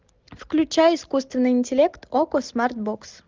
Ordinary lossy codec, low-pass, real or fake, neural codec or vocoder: Opus, 32 kbps; 7.2 kHz; real; none